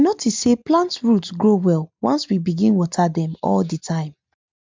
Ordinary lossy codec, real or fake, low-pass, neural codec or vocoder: MP3, 64 kbps; real; 7.2 kHz; none